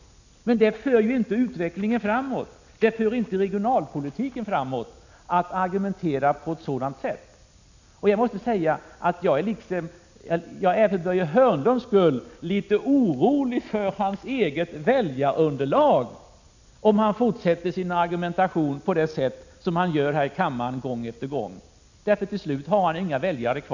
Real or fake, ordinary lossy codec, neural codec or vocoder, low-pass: real; none; none; 7.2 kHz